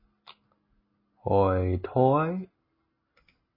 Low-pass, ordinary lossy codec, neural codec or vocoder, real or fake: 5.4 kHz; MP3, 24 kbps; none; real